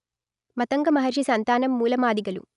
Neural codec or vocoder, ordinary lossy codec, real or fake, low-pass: none; none; real; 10.8 kHz